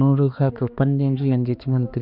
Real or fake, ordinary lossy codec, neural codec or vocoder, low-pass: fake; none; codec, 16 kHz, 2 kbps, X-Codec, HuBERT features, trained on balanced general audio; 5.4 kHz